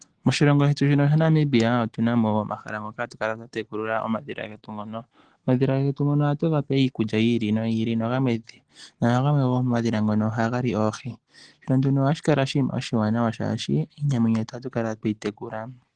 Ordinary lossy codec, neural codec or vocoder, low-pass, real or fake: Opus, 16 kbps; none; 9.9 kHz; real